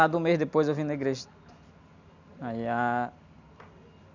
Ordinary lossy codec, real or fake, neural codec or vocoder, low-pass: none; real; none; 7.2 kHz